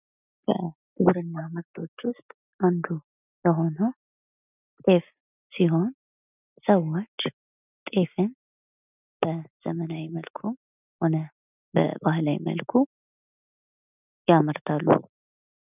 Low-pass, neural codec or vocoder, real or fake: 3.6 kHz; none; real